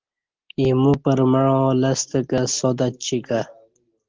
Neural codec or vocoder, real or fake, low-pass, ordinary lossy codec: none; real; 7.2 kHz; Opus, 16 kbps